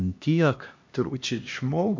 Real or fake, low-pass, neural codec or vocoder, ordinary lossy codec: fake; 7.2 kHz; codec, 16 kHz, 1 kbps, X-Codec, HuBERT features, trained on LibriSpeech; MP3, 48 kbps